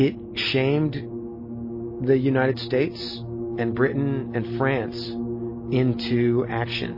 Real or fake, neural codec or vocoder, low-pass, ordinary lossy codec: real; none; 5.4 kHz; MP3, 24 kbps